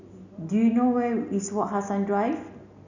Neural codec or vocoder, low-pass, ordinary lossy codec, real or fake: none; 7.2 kHz; none; real